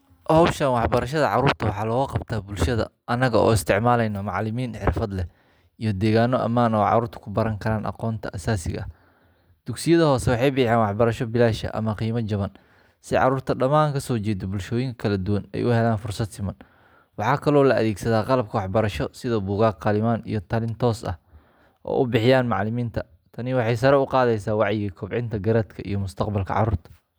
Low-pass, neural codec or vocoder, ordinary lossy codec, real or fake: none; none; none; real